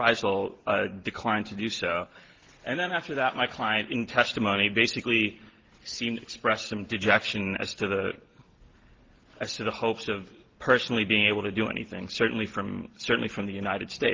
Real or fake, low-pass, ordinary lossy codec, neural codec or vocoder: real; 7.2 kHz; Opus, 16 kbps; none